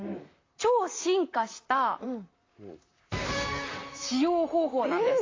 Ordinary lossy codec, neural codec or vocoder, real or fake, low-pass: AAC, 32 kbps; vocoder, 44.1 kHz, 128 mel bands, Pupu-Vocoder; fake; 7.2 kHz